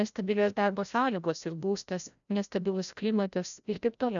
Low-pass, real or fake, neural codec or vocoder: 7.2 kHz; fake; codec, 16 kHz, 0.5 kbps, FreqCodec, larger model